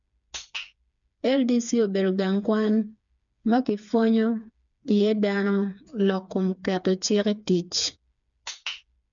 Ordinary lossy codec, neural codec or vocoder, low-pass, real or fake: none; codec, 16 kHz, 4 kbps, FreqCodec, smaller model; 7.2 kHz; fake